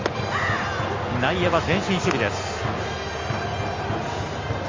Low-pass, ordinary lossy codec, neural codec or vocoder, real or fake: 7.2 kHz; Opus, 32 kbps; none; real